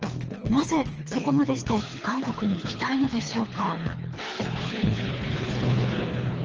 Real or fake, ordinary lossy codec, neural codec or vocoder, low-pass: fake; Opus, 24 kbps; codec, 24 kHz, 3 kbps, HILCodec; 7.2 kHz